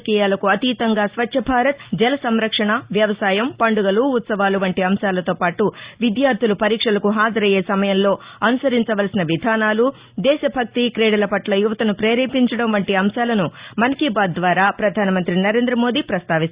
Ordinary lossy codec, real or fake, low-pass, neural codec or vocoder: Opus, 64 kbps; real; 3.6 kHz; none